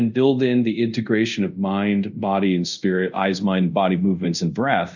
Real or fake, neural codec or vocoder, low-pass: fake; codec, 24 kHz, 0.5 kbps, DualCodec; 7.2 kHz